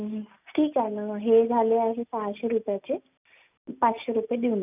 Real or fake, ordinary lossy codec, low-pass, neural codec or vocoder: real; none; 3.6 kHz; none